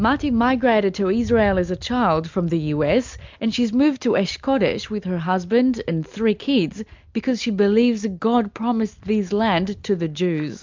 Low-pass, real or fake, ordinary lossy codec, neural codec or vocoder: 7.2 kHz; real; MP3, 64 kbps; none